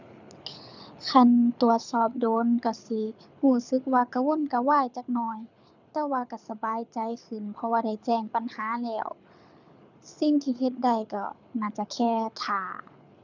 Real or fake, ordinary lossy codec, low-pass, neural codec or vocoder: fake; none; 7.2 kHz; codec, 24 kHz, 6 kbps, HILCodec